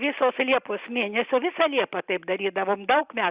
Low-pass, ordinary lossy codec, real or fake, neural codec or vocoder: 3.6 kHz; Opus, 64 kbps; real; none